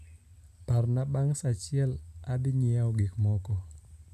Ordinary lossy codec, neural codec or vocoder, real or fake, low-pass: none; none; real; 14.4 kHz